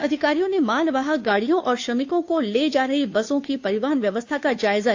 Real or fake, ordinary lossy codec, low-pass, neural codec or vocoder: fake; AAC, 48 kbps; 7.2 kHz; codec, 16 kHz, 2 kbps, FunCodec, trained on Chinese and English, 25 frames a second